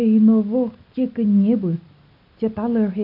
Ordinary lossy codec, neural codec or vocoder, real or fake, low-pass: none; none; real; 5.4 kHz